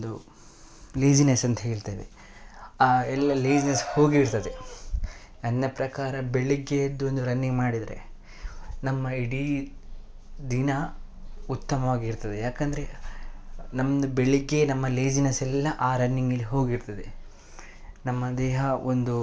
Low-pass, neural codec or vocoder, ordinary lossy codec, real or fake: none; none; none; real